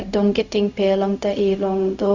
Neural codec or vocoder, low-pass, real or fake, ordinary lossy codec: codec, 16 kHz, 0.4 kbps, LongCat-Audio-Codec; 7.2 kHz; fake; none